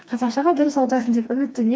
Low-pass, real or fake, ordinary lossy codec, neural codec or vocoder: none; fake; none; codec, 16 kHz, 2 kbps, FreqCodec, smaller model